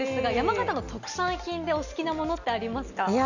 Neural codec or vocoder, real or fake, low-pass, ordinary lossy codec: none; real; 7.2 kHz; none